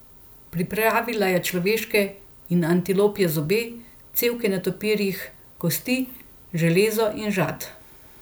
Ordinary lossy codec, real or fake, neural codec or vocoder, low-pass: none; real; none; none